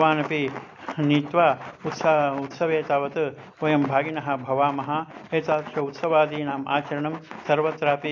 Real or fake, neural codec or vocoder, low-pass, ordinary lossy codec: real; none; 7.2 kHz; AAC, 48 kbps